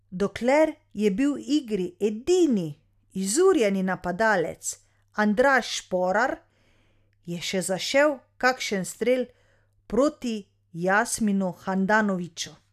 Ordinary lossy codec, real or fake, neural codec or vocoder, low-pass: none; real; none; 14.4 kHz